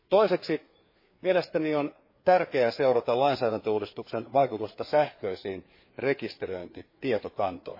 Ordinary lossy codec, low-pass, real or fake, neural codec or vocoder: MP3, 24 kbps; 5.4 kHz; fake; codec, 16 kHz, 4 kbps, FreqCodec, larger model